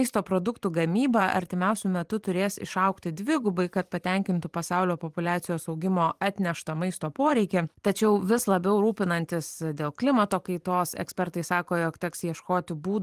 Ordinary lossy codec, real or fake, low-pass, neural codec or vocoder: Opus, 24 kbps; fake; 14.4 kHz; vocoder, 44.1 kHz, 128 mel bands every 512 samples, BigVGAN v2